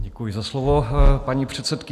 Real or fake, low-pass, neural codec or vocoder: real; 14.4 kHz; none